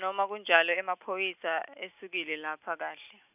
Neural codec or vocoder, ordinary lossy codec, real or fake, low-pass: none; none; real; 3.6 kHz